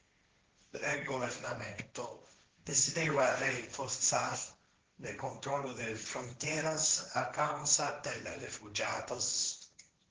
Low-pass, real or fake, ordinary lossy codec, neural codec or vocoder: 7.2 kHz; fake; Opus, 16 kbps; codec, 16 kHz, 1.1 kbps, Voila-Tokenizer